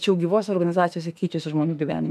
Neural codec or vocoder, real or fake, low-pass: autoencoder, 48 kHz, 32 numbers a frame, DAC-VAE, trained on Japanese speech; fake; 14.4 kHz